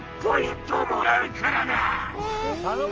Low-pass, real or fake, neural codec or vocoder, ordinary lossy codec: 7.2 kHz; real; none; Opus, 24 kbps